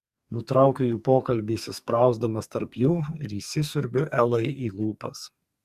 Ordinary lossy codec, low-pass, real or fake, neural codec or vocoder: Opus, 64 kbps; 14.4 kHz; fake; codec, 44.1 kHz, 2.6 kbps, SNAC